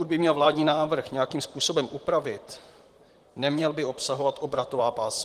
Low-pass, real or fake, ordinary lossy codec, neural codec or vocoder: 14.4 kHz; fake; Opus, 24 kbps; vocoder, 44.1 kHz, 128 mel bands, Pupu-Vocoder